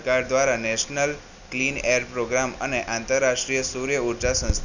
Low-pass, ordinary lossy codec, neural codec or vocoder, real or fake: 7.2 kHz; none; none; real